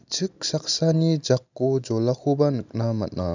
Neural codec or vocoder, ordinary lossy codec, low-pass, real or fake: none; none; 7.2 kHz; real